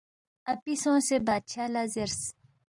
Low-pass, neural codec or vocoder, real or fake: 10.8 kHz; vocoder, 44.1 kHz, 128 mel bands every 512 samples, BigVGAN v2; fake